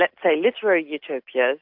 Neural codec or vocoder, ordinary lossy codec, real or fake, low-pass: none; MP3, 48 kbps; real; 5.4 kHz